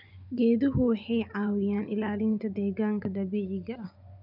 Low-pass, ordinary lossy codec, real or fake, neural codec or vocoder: 5.4 kHz; none; fake; vocoder, 24 kHz, 100 mel bands, Vocos